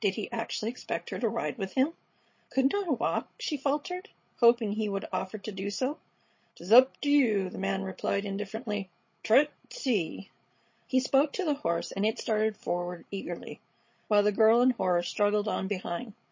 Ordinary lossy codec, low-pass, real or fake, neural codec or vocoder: MP3, 32 kbps; 7.2 kHz; fake; codec, 16 kHz, 16 kbps, FreqCodec, larger model